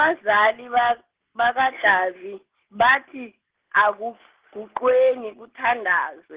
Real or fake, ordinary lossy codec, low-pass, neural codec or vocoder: real; Opus, 16 kbps; 3.6 kHz; none